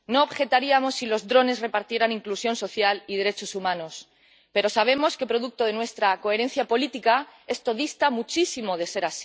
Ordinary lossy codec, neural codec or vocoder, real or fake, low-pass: none; none; real; none